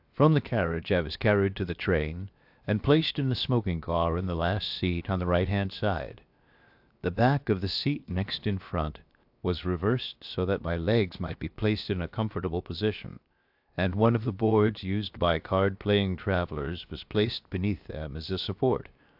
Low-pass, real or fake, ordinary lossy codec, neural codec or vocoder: 5.4 kHz; fake; AAC, 48 kbps; codec, 16 kHz, 0.7 kbps, FocalCodec